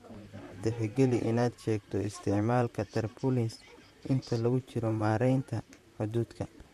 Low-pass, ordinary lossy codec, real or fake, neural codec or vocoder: 14.4 kHz; MP3, 64 kbps; fake; vocoder, 44.1 kHz, 128 mel bands, Pupu-Vocoder